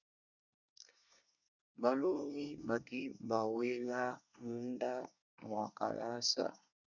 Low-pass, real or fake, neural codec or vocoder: 7.2 kHz; fake; codec, 24 kHz, 1 kbps, SNAC